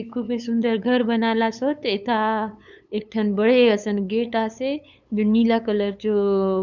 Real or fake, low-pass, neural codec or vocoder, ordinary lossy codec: fake; 7.2 kHz; codec, 16 kHz, 8 kbps, FunCodec, trained on LibriTTS, 25 frames a second; none